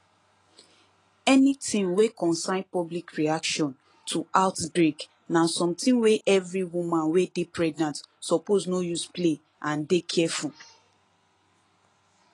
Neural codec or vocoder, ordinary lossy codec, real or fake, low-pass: none; AAC, 32 kbps; real; 10.8 kHz